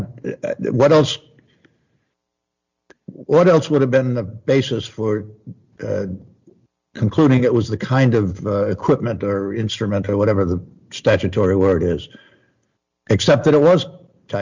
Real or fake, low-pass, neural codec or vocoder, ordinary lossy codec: real; 7.2 kHz; none; MP3, 64 kbps